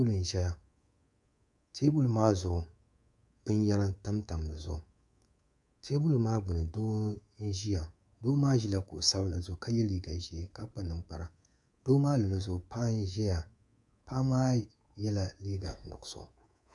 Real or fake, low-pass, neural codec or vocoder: fake; 10.8 kHz; codec, 24 kHz, 3.1 kbps, DualCodec